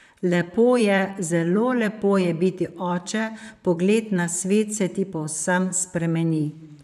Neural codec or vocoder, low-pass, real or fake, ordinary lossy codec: vocoder, 44.1 kHz, 128 mel bands, Pupu-Vocoder; 14.4 kHz; fake; none